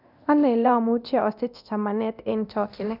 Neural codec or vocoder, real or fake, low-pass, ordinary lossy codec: codec, 24 kHz, 0.9 kbps, DualCodec; fake; 5.4 kHz; none